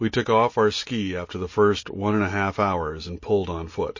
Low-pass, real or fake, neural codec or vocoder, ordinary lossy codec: 7.2 kHz; real; none; MP3, 32 kbps